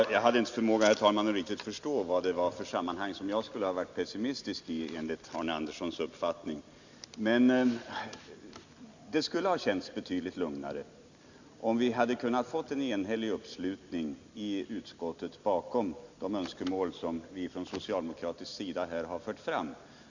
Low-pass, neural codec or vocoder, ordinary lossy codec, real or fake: 7.2 kHz; none; Opus, 64 kbps; real